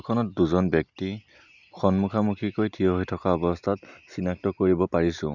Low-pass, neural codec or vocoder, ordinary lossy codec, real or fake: 7.2 kHz; none; none; real